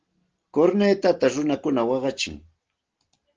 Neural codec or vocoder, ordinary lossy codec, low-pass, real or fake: none; Opus, 16 kbps; 7.2 kHz; real